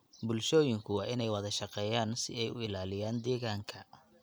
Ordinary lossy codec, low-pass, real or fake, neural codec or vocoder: none; none; real; none